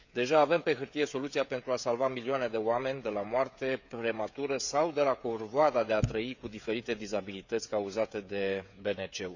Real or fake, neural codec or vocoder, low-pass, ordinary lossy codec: fake; codec, 16 kHz, 16 kbps, FreqCodec, smaller model; 7.2 kHz; none